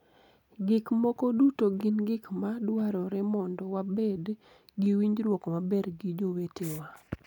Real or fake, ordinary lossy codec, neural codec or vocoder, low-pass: real; none; none; 19.8 kHz